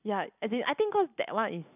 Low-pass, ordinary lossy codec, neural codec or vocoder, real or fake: 3.6 kHz; none; none; real